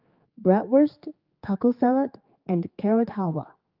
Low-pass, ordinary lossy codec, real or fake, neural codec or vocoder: 5.4 kHz; Opus, 24 kbps; fake; codec, 16 kHz, 4 kbps, X-Codec, HuBERT features, trained on general audio